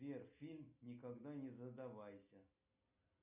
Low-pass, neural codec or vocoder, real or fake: 3.6 kHz; none; real